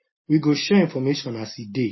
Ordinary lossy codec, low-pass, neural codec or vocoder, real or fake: MP3, 24 kbps; 7.2 kHz; none; real